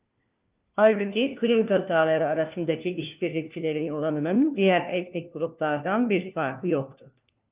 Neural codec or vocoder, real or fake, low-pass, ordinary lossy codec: codec, 16 kHz, 1 kbps, FunCodec, trained on LibriTTS, 50 frames a second; fake; 3.6 kHz; Opus, 24 kbps